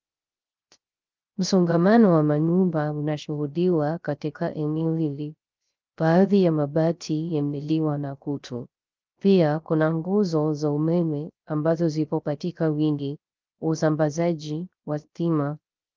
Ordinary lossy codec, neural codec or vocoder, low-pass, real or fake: Opus, 24 kbps; codec, 16 kHz, 0.3 kbps, FocalCodec; 7.2 kHz; fake